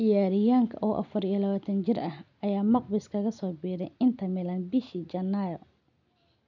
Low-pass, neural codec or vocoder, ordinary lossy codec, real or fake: 7.2 kHz; none; none; real